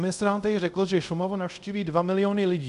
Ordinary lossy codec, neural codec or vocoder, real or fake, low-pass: AAC, 96 kbps; codec, 24 kHz, 0.5 kbps, DualCodec; fake; 10.8 kHz